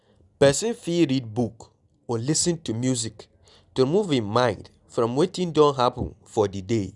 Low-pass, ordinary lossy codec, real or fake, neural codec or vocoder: 10.8 kHz; none; real; none